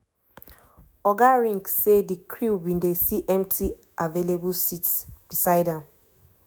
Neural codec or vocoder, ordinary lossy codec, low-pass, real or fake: autoencoder, 48 kHz, 128 numbers a frame, DAC-VAE, trained on Japanese speech; none; none; fake